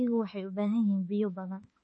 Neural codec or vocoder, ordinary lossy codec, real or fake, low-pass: autoencoder, 48 kHz, 32 numbers a frame, DAC-VAE, trained on Japanese speech; MP3, 32 kbps; fake; 10.8 kHz